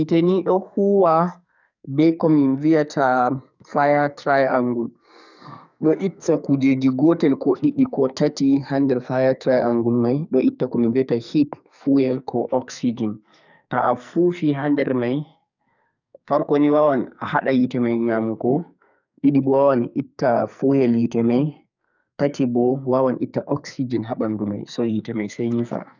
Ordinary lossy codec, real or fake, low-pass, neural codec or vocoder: none; fake; 7.2 kHz; codec, 44.1 kHz, 2.6 kbps, SNAC